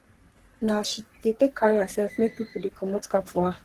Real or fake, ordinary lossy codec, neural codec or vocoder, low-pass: fake; Opus, 16 kbps; codec, 44.1 kHz, 3.4 kbps, Pupu-Codec; 14.4 kHz